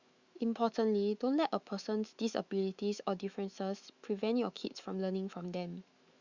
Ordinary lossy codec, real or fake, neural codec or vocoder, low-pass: Opus, 64 kbps; fake; autoencoder, 48 kHz, 128 numbers a frame, DAC-VAE, trained on Japanese speech; 7.2 kHz